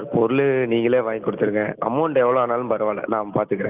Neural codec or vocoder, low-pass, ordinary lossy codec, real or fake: none; 3.6 kHz; Opus, 32 kbps; real